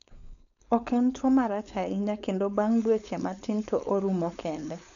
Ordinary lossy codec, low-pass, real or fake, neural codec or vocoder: none; 7.2 kHz; fake; codec, 16 kHz, 4.8 kbps, FACodec